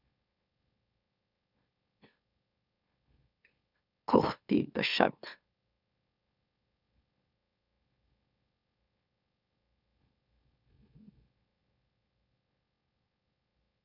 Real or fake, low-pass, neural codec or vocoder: fake; 5.4 kHz; autoencoder, 44.1 kHz, a latent of 192 numbers a frame, MeloTTS